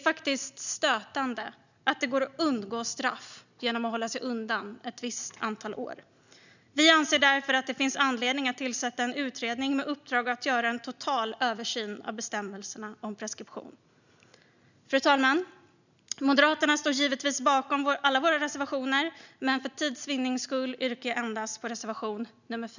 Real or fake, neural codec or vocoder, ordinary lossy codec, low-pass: real; none; none; 7.2 kHz